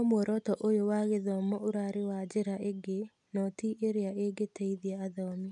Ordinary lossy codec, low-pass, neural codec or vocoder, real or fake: none; 10.8 kHz; none; real